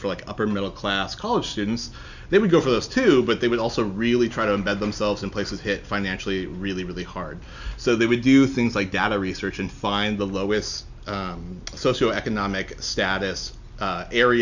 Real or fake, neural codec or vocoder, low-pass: real; none; 7.2 kHz